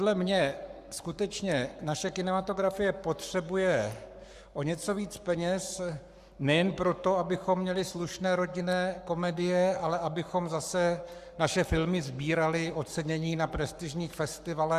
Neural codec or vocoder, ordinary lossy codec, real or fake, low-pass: codec, 44.1 kHz, 7.8 kbps, Pupu-Codec; Opus, 64 kbps; fake; 14.4 kHz